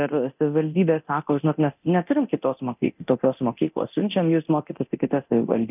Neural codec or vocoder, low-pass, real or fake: codec, 24 kHz, 0.9 kbps, DualCodec; 3.6 kHz; fake